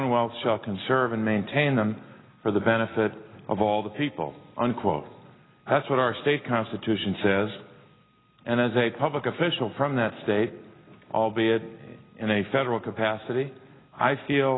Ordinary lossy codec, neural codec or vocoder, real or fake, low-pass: AAC, 16 kbps; none; real; 7.2 kHz